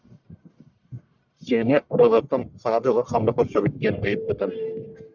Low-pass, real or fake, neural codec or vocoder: 7.2 kHz; fake; codec, 44.1 kHz, 1.7 kbps, Pupu-Codec